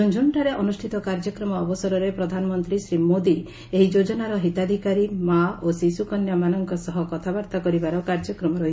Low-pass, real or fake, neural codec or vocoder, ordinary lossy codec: none; real; none; none